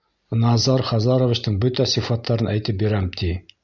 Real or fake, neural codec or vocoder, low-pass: real; none; 7.2 kHz